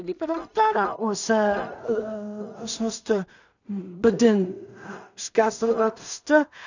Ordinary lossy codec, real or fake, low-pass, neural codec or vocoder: none; fake; 7.2 kHz; codec, 16 kHz in and 24 kHz out, 0.4 kbps, LongCat-Audio-Codec, two codebook decoder